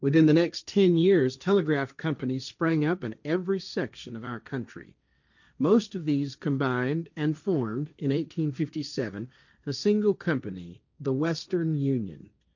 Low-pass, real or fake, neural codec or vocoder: 7.2 kHz; fake; codec, 16 kHz, 1.1 kbps, Voila-Tokenizer